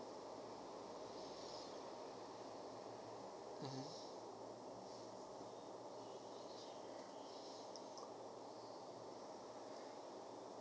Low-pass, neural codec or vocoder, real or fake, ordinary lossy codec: none; none; real; none